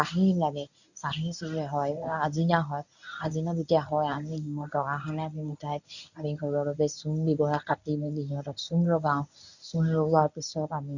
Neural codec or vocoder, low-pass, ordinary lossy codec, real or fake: codec, 24 kHz, 0.9 kbps, WavTokenizer, medium speech release version 1; 7.2 kHz; none; fake